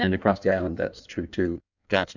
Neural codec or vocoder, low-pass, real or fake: codec, 16 kHz in and 24 kHz out, 1.1 kbps, FireRedTTS-2 codec; 7.2 kHz; fake